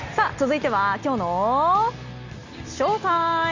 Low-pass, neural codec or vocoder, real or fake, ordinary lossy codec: 7.2 kHz; none; real; Opus, 64 kbps